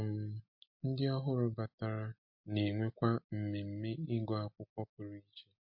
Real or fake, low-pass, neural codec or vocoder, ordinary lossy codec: real; 5.4 kHz; none; MP3, 32 kbps